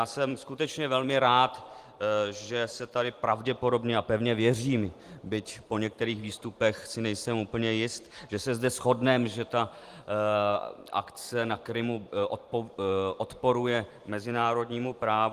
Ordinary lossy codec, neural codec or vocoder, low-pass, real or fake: Opus, 24 kbps; vocoder, 44.1 kHz, 128 mel bands every 512 samples, BigVGAN v2; 14.4 kHz; fake